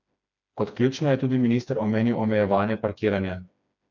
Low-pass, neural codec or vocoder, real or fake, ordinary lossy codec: 7.2 kHz; codec, 16 kHz, 2 kbps, FreqCodec, smaller model; fake; none